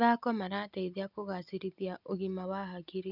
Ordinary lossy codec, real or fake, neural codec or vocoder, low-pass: none; real; none; 5.4 kHz